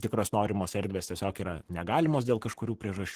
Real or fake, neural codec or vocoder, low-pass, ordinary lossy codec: fake; codec, 44.1 kHz, 7.8 kbps, Pupu-Codec; 14.4 kHz; Opus, 16 kbps